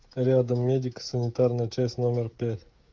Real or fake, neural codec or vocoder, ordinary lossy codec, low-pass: fake; codec, 16 kHz, 16 kbps, FreqCodec, smaller model; Opus, 32 kbps; 7.2 kHz